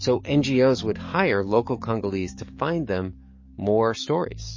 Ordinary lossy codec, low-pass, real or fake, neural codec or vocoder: MP3, 32 kbps; 7.2 kHz; fake; codec, 44.1 kHz, 7.8 kbps, DAC